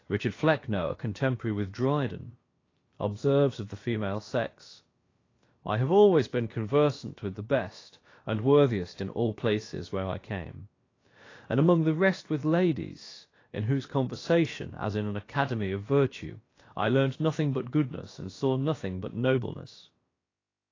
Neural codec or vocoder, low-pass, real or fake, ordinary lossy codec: codec, 16 kHz, about 1 kbps, DyCAST, with the encoder's durations; 7.2 kHz; fake; AAC, 32 kbps